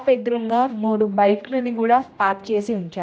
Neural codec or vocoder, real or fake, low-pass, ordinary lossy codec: codec, 16 kHz, 1 kbps, X-Codec, HuBERT features, trained on general audio; fake; none; none